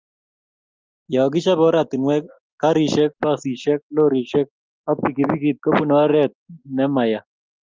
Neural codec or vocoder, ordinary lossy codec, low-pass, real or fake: none; Opus, 24 kbps; 7.2 kHz; real